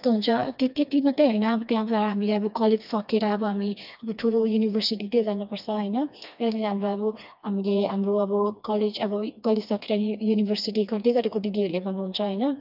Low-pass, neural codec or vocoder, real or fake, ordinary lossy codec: 5.4 kHz; codec, 16 kHz, 2 kbps, FreqCodec, smaller model; fake; none